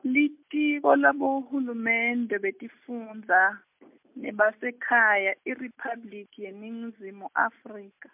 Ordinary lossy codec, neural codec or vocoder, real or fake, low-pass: MP3, 32 kbps; none; real; 3.6 kHz